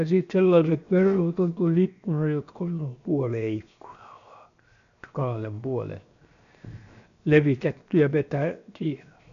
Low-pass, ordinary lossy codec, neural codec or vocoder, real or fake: 7.2 kHz; none; codec, 16 kHz, 0.7 kbps, FocalCodec; fake